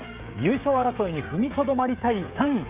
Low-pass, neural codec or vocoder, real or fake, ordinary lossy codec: 3.6 kHz; codec, 16 kHz, 16 kbps, FreqCodec, smaller model; fake; Opus, 64 kbps